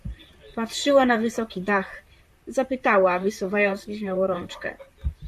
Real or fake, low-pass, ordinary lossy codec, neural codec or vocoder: fake; 14.4 kHz; AAC, 96 kbps; vocoder, 44.1 kHz, 128 mel bands, Pupu-Vocoder